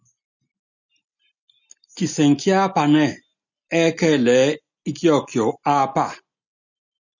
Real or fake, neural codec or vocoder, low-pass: real; none; 7.2 kHz